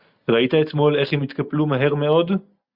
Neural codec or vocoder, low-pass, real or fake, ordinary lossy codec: none; 5.4 kHz; real; Opus, 64 kbps